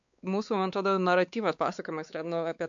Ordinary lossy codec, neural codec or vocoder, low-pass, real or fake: MP3, 64 kbps; codec, 16 kHz, 4 kbps, X-Codec, WavLM features, trained on Multilingual LibriSpeech; 7.2 kHz; fake